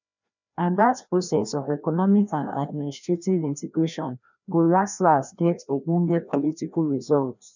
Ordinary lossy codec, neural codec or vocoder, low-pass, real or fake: none; codec, 16 kHz, 1 kbps, FreqCodec, larger model; 7.2 kHz; fake